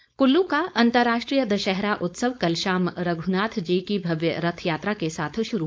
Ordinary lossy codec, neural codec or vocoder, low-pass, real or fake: none; codec, 16 kHz, 4.8 kbps, FACodec; none; fake